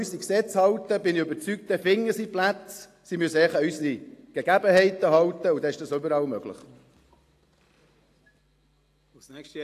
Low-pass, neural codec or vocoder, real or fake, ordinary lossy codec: 14.4 kHz; none; real; AAC, 64 kbps